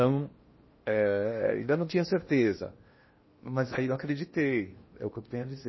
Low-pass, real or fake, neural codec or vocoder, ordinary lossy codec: 7.2 kHz; fake; codec, 16 kHz in and 24 kHz out, 0.8 kbps, FocalCodec, streaming, 65536 codes; MP3, 24 kbps